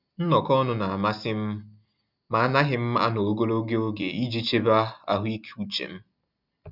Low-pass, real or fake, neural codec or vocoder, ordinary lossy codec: 5.4 kHz; real; none; none